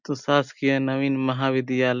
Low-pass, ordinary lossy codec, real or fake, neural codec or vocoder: 7.2 kHz; none; real; none